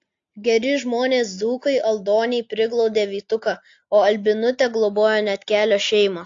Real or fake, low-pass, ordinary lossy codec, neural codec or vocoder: real; 7.2 kHz; AAC, 48 kbps; none